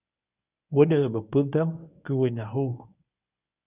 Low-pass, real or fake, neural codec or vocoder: 3.6 kHz; fake; codec, 24 kHz, 0.9 kbps, WavTokenizer, medium speech release version 1